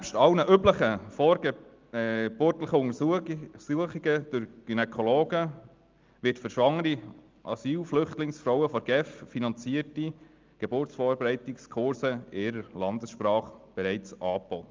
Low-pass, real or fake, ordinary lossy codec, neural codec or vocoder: 7.2 kHz; real; Opus, 24 kbps; none